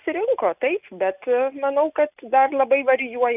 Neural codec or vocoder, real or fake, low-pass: none; real; 3.6 kHz